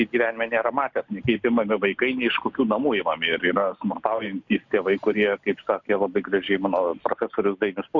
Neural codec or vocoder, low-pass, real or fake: none; 7.2 kHz; real